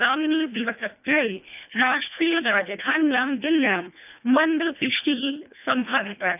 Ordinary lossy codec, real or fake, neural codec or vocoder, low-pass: none; fake; codec, 24 kHz, 1.5 kbps, HILCodec; 3.6 kHz